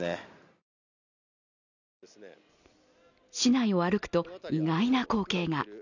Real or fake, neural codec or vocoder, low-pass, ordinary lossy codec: real; none; 7.2 kHz; none